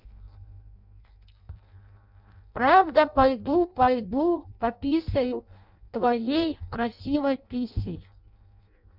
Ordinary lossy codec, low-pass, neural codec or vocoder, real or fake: none; 5.4 kHz; codec, 16 kHz in and 24 kHz out, 0.6 kbps, FireRedTTS-2 codec; fake